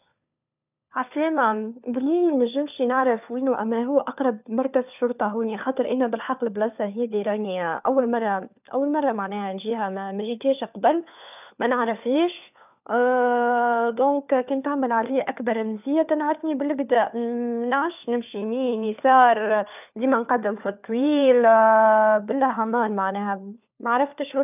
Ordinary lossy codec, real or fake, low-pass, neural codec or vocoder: none; fake; 3.6 kHz; codec, 16 kHz, 4 kbps, FunCodec, trained on LibriTTS, 50 frames a second